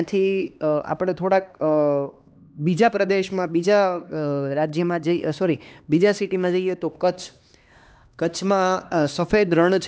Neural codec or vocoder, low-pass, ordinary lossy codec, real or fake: codec, 16 kHz, 2 kbps, X-Codec, HuBERT features, trained on LibriSpeech; none; none; fake